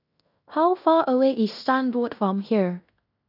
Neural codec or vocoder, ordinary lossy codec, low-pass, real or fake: codec, 16 kHz in and 24 kHz out, 0.9 kbps, LongCat-Audio-Codec, fine tuned four codebook decoder; none; 5.4 kHz; fake